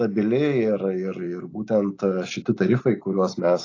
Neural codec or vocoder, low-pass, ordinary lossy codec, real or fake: none; 7.2 kHz; AAC, 32 kbps; real